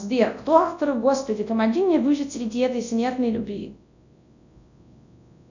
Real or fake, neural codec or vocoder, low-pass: fake; codec, 24 kHz, 0.9 kbps, WavTokenizer, large speech release; 7.2 kHz